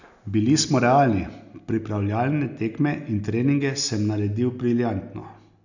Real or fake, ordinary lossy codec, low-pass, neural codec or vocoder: real; none; 7.2 kHz; none